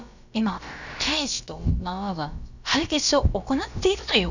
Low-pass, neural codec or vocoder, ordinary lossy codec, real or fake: 7.2 kHz; codec, 16 kHz, about 1 kbps, DyCAST, with the encoder's durations; none; fake